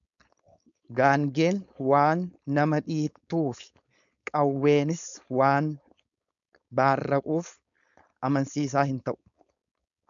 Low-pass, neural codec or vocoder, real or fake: 7.2 kHz; codec, 16 kHz, 4.8 kbps, FACodec; fake